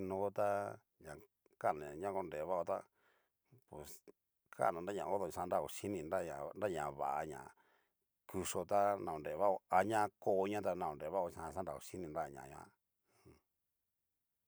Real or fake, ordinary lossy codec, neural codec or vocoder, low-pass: real; none; none; none